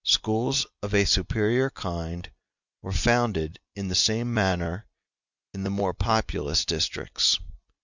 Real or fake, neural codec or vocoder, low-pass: real; none; 7.2 kHz